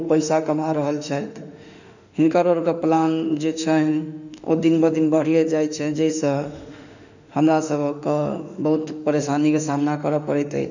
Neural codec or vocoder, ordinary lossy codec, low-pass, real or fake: autoencoder, 48 kHz, 32 numbers a frame, DAC-VAE, trained on Japanese speech; none; 7.2 kHz; fake